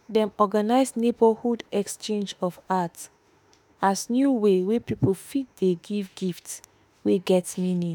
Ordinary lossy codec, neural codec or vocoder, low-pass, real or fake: none; autoencoder, 48 kHz, 32 numbers a frame, DAC-VAE, trained on Japanese speech; none; fake